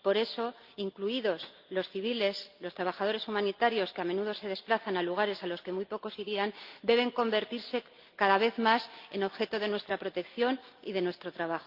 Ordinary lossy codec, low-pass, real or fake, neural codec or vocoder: Opus, 24 kbps; 5.4 kHz; real; none